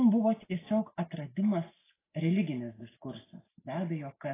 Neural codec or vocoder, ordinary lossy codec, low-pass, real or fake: none; AAC, 16 kbps; 3.6 kHz; real